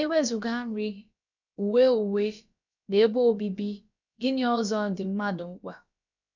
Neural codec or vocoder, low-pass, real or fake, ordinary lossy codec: codec, 16 kHz, about 1 kbps, DyCAST, with the encoder's durations; 7.2 kHz; fake; none